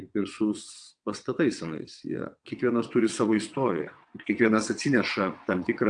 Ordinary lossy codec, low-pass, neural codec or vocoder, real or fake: AAC, 64 kbps; 9.9 kHz; vocoder, 22.05 kHz, 80 mel bands, WaveNeXt; fake